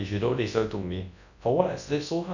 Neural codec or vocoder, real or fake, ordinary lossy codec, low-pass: codec, 24 kHz, 0.9 kbps, WavTokenizer, large speech release; fake; none; 7.2 kHz